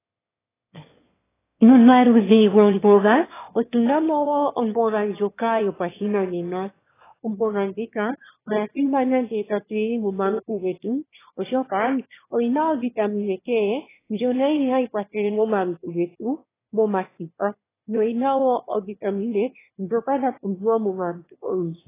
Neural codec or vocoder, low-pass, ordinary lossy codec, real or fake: autoencoder, 22.05 kHz, a latent of 192 numbers a frame, VITS, trained on one speaker; 3.6 kHz; AAC, 16 kbps; fake